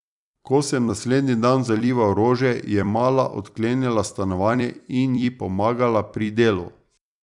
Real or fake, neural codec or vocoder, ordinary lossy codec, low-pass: fake; vocoder, 44.1 kHz, 128 mel bands every 256 samples, BigVGAN v2; none; 10.8 kHz